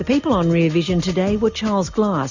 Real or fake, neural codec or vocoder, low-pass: real; none; 7.2 kHz